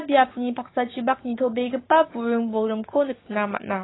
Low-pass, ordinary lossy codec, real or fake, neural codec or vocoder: 7.2 kHz; AAC, 16 kbps; fake; codec, 16 kHz, 6 kbps, DAC